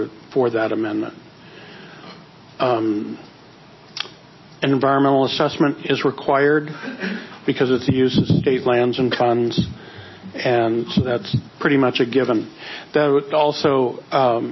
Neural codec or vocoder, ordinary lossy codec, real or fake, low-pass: none; MP3, 24 kbps; real; 7.2 kHz